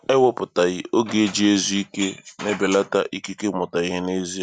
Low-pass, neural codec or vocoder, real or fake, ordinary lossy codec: 9.9 kHz; none; real; none